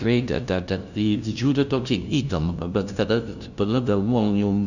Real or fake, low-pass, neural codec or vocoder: fake; 7.2 kHz; codec, 16 kHz, 0.5 kbps, FunCodec, trained on LibriTTS, 25 frames a second